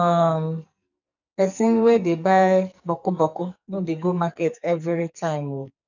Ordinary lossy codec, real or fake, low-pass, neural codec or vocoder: none; fake; 7.2 kHz; codec, 44.1 kHz, 2.6 kbps, SNAC